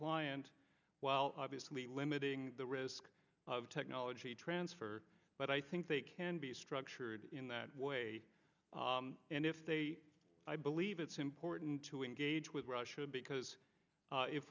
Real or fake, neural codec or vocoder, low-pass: real; none; 7.2 kHz